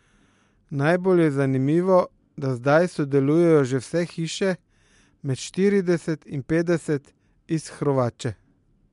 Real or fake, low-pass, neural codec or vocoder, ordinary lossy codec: real; 10.8 kHz; none; MP3, 64 kbps